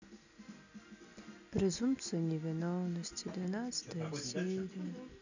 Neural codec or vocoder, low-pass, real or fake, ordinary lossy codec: none; 7.2 kHz; real; none